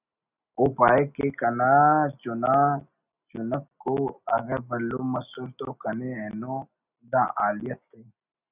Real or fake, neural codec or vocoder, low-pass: real; none; 3.6 kHz